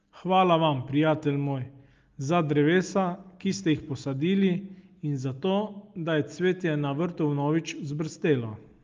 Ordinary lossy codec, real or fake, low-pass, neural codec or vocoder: Opus, 32 kbps; real; 7.2 kHz; none